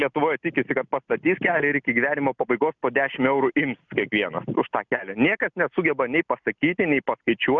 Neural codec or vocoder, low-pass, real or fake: none; 7.2 kHz; real